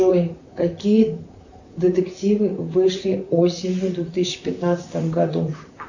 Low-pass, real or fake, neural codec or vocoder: 7.2 kHz; fake; vocoder, 44.1 kHz, 128 mel bands, Pupu-Vocoder